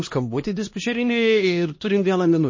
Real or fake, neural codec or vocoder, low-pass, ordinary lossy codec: fake; codec, 16 kHz, 1 kbps, X-Codec, HuBERT features, trained on LibriSpeech; 7.2 kHz; MP3, 32 kbps